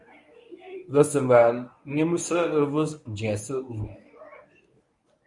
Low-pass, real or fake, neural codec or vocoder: 10.8 kHz; fake; codec, 24 kHz, 0.9 kbps, WavTokenizer, medium speech release version 1